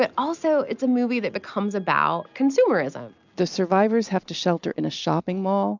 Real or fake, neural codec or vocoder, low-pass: real; none; 7.2 kHz